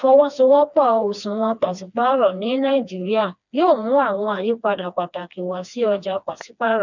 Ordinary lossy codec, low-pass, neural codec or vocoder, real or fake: none; 7.2 kHz; codec, 16 kHz, 2 kbps, FreqCodec, smaller model; fake